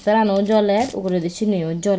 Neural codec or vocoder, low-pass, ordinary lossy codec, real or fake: none; none; none; real